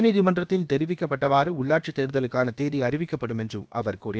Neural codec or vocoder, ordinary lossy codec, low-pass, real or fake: codec, 16 kHz, about 1 kbps, DyCAST, with the encoder's durations; none; none; fake